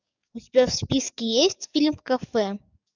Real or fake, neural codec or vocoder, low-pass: fake; codec, 44.1 kHz, 7.8 kbps, DAC; 7.2 kHz